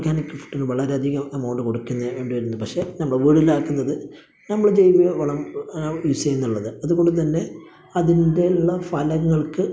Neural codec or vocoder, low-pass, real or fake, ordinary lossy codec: none; none; real; none